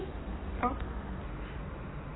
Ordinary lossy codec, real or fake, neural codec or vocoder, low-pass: AAC, 16 kbps; real; none; 7.2 kHz